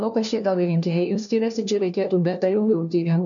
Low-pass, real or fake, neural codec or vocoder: 7.2 kHz; fake; codec, 16 kHz, 1 kbps, FunCodec, trained on LibriTTS, 50 frames a second